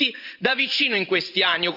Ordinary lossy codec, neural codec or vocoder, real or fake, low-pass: none; vocoder, 44.1 kHz, 128 mel bands every 512 samples, BigVGAN v2; fake; 5.4 kHz